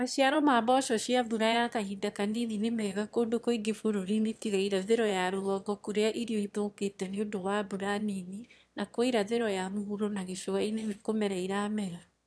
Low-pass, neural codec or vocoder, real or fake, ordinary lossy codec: none; autoencoder, 22.05 kHz, a latent of 192 numbers a frame, VITS, trained on one speaker; fake; none